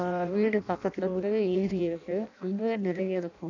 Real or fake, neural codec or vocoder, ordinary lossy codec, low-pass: fake; codec, 16 kHz in and 24 kHz out, 0.6 kbps, FireRedTTS-2 codec; none; 7.2 kHz